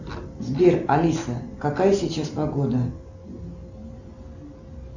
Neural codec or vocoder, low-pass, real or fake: none; 7.2 kHz; real